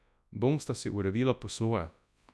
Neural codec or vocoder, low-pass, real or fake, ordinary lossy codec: codec, 24 kHz, 0.9 kbps, WavTokenizer, large speech release; none; fake; none